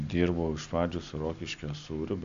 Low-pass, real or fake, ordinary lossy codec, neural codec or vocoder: 7.2 kHz; real; AAC, 64 kbps; none